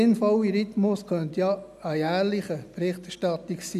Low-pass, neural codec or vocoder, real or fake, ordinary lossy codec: 14.4 kHz; none; real; none